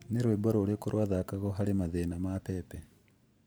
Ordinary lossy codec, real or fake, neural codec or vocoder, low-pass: none; fake; vocoder, 44.1 kHz, 128 mel bands every 512 samples, BigVGAN v2; none